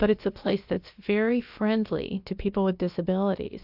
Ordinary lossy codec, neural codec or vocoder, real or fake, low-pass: AAC, 48 kbps; codec, 16 kHz, about 1 kbps, DyCAST, with the encoder's durations; fake; 5.4 kHz